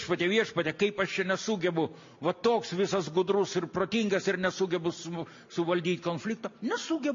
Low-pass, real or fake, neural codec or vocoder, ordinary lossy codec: 7.2 kHz; real; none; MP3, 48 kbps